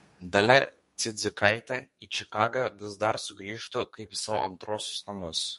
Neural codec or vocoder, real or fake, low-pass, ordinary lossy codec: codec, 32 kHz, 1.9 kbps, SNAC; fake; 14.4 kHz; MP3, 48 kbps